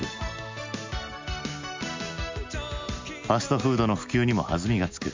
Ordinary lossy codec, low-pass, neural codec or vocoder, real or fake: MP3, 64 kbps; 7.2 kHz; none; real